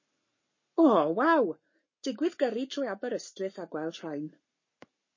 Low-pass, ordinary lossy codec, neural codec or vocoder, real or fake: 7.2 kHz; MP3, 32 kbps; codec, 44.1 kHz, 7.8 kbps, Pupu-Codec; fake